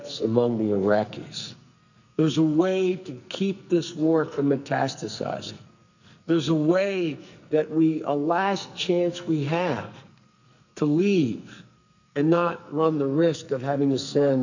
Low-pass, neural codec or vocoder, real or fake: 7.2 kHz; codec, 44.1 kHz, 2.6 kbps, SNAC; fake